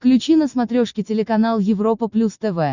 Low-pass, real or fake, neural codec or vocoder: 7.2 kHz; real; none